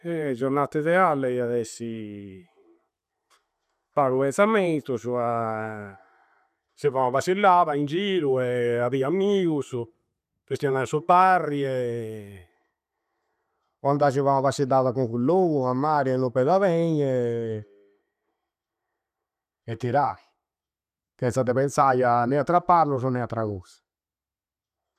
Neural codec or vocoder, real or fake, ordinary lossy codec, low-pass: none; real; none; 14.4 kHz